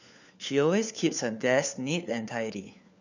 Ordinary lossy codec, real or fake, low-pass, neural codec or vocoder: none; fake; 7.2 kHz; codec, 16 kHz, 4 kbps, FunCodec, trained on LibriTTS, 50 frames a second